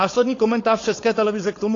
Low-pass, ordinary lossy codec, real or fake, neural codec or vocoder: 7.2 kHz; AAC, 32 kbps; fake; codec, 16 kHz, 4.8 kbps, FACodec